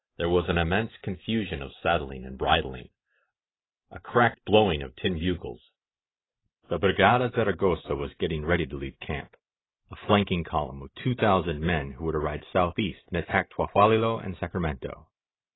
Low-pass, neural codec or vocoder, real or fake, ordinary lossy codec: 7.2 kHz; vocoder, 44.1 kHz, 128 mel bands every 512 samples, BigVGAN v2; fake; AAC, 16 kbps